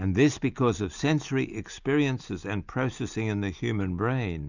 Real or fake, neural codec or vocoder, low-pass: real; none; 7.2 kHz